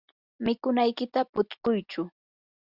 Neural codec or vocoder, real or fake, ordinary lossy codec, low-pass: none; real; Opus, 64 kbps; 5.4 kHz